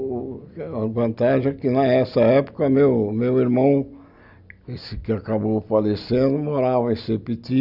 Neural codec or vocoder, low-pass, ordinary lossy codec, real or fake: none; 5.4 kHz; Opus, 64 kbps; real